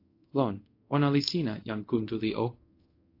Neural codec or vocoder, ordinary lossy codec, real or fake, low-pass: codec, 24 kHz, 0.5 kbps, DualCodec; AAC, 32 kbps; fake; 5.4 kHz